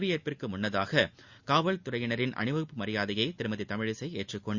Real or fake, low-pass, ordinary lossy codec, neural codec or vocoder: real; 7.2 kHz; Opus, 64 kbps; none